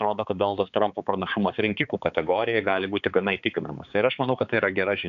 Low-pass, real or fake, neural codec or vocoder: 7.2 kHz; fake; codec, 16 kHz, 4 kbps, X-Codec, HuBERT features, trained on balanced general audio